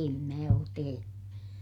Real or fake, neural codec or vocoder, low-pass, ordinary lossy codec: real; none; 19.8 kHz; none